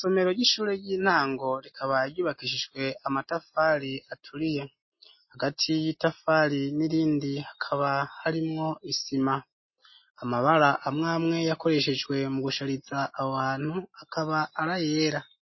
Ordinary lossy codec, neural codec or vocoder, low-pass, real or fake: MP3, 24 kbps; none; 7.2 kHz; real